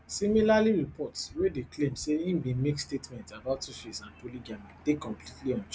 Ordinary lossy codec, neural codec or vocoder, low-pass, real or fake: none; none; none; real